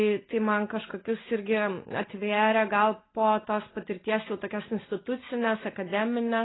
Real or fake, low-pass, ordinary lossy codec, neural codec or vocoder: real; 7.2 kHz; AAC, 16 kbps; none